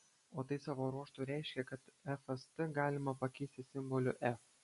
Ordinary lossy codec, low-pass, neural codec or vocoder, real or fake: MP3, 48 kbps; 14.4 kHz; none; real